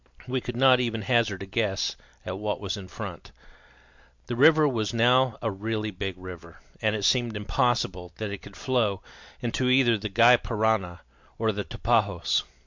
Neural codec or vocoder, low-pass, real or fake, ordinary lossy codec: none; 7.2 kHz; real; MP3, 64 kbps